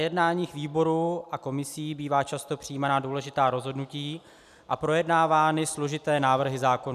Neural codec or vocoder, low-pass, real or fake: none; 14.4 kHz; real